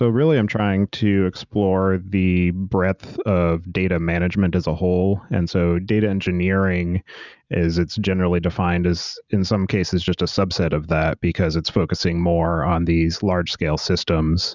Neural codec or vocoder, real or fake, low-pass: none; real; 7.2 kHz